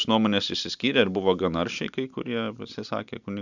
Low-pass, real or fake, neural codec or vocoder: 7.2 kHz; real; none